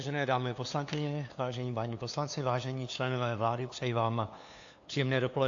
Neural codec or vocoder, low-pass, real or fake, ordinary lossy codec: codec, 16 kHz, 2 kbps, FunCodec, trained on LibriTTS, 25 frames a second; 7.2 kHz; fake; AAC, 48 kbps